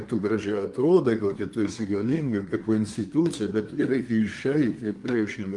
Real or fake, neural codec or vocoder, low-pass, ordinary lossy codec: fake; codec, 24 kHz, 1 kbps, SNAC; 10.8 kHz; Opus, 32 kbps